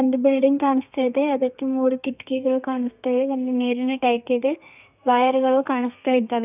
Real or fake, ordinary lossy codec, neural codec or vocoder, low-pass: fake; none; codec, 32 kHz, 1.9 kbps, SNAC; 3.6 kHz